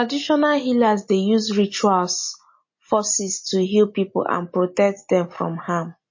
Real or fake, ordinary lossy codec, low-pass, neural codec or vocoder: real; MP3, 32 kbps; 7.2 kHz; none